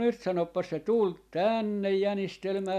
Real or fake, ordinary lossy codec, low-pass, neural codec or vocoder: real; none; 14.4 kHz; none